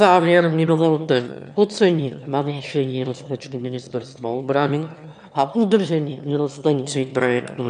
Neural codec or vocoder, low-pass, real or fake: autoencoder, 22.05 kHz, a latent of 192 numbers a frame, VITS, trained on one speaker; 9.9 kHz; fake